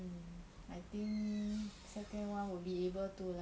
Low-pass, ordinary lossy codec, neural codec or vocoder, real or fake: none; none; none; real